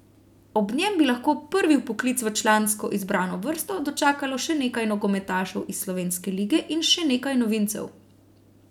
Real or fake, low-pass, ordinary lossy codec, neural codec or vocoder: real; 19.8 kHz; none; none